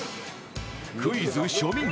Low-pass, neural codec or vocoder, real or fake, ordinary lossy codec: none; none; real; none